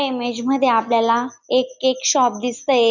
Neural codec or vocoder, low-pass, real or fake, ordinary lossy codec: none; 7.2 kHz; real; none